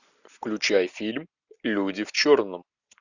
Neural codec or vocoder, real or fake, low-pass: none; real; 7.2 kHz